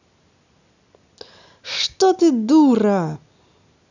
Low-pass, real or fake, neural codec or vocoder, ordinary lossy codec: 7.2 kHz; real; none; none